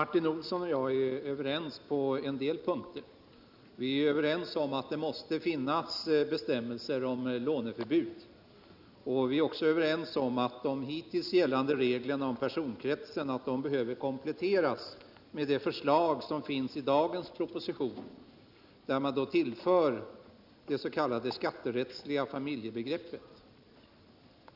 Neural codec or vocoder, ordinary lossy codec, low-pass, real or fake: none; none; 5.4 kHz; real